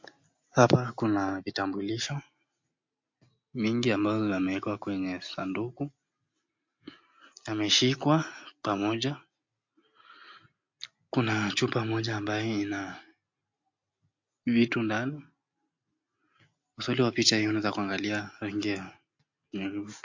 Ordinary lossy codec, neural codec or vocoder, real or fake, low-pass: MP3, 48 kbps; none; real; 7.2 kHz